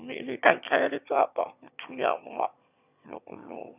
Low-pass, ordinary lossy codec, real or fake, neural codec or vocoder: 3.6 kHz; none; fake; autoencoder, 22.05 kHz, a latent of 192 numbers a frame, VITS, trained on one speaker